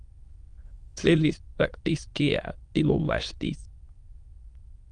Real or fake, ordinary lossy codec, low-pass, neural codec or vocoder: fake; Opus, 32 kbps; 9.9 kHz; autoencoder, 22.05 kHz, a latent of 192 numbers a frame, VITS, trained on many speakers